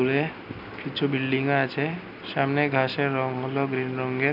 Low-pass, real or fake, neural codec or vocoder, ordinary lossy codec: 5.4 kHz; real; none; none